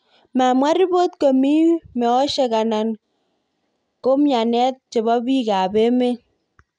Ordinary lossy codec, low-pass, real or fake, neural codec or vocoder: none; 9.9 kHz; real; none